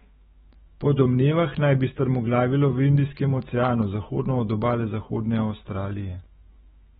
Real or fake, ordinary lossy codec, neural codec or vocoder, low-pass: fake; AAC, 16 kbps; autoencoder, 48 kHz, 128 numbers a frame, DAC-VAE, trained on Japanese speech; 19.8 kHz